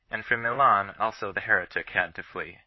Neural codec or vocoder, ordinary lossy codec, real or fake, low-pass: vocoder, 44.1 kHz, 128 mel bands, Pupu-Vocoder; MP3, 24 kbps; fake; 7.2 kHz